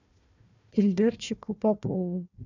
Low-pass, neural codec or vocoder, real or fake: 7.2 kHz; codec, 16 kHz, 1 kbps, FunCodec, trained on Chinese and English, 50 frames a second; fake